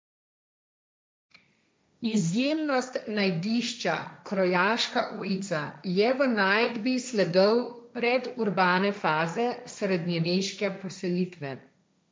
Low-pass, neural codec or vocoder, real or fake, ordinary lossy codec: none; codec, 16 kHz, 1.1 kbps, Voila-Tokenizer; fake; none